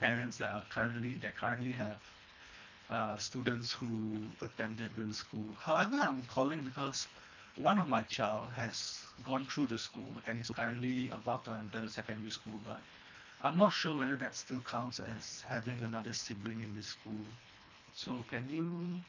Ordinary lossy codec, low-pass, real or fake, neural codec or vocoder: MP3, 64 kbps; 7.2 kHz; fake; codec, 24 kHz, 1.5 kbps, HILCodec